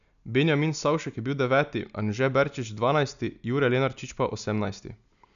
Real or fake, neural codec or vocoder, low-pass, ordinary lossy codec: real; none; 7.2 kHz; none